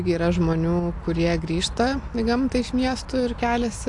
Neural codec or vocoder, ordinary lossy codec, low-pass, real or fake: none; AAC, 64 kbps; 10.8 kHz; real